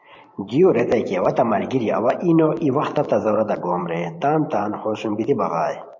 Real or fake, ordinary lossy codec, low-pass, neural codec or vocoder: fake; MP3, 48 kbps; 7.2 kHz; vocoder, 44.1 kHz, 128 mel bands every 512 samples, BigVGAN v2